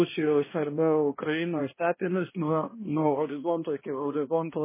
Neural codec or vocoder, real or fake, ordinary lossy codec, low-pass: codec, 16 kHz, 1 kbps, X-Codec, HuBERT features, trained on balanced general audio; fake; MP3, 16 kbps; 3.6 kHz